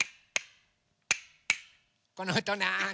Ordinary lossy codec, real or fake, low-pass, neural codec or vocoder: none; real; none; none